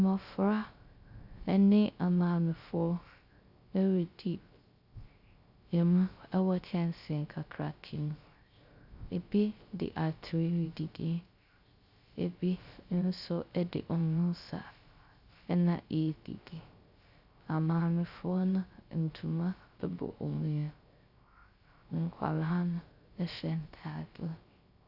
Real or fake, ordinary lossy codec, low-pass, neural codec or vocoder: fake; Opus, 64 kbps; 5.4 kHz; codec, 16 kHz, 0.3 kbps, FocalCodec